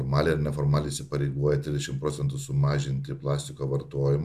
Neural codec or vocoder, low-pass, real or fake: none; 14.4 kHz; real